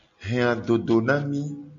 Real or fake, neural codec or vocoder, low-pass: real; none; 7.2 kHz